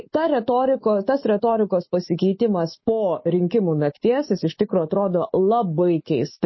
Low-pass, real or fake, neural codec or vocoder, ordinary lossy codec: 7.2 kHz; fake; autoencoder, 48 kHz, 128 numbers a frame, DAC-VAE, trained on Japanese speech; MP3, 24 kbps